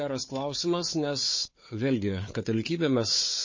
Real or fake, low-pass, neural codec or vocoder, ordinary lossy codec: fake; 7.2 kHz; codec, 16 kHz, 4 kbps, FunCodec, trained on Chinese and English, 50 frames a second; MP3, 32 kbps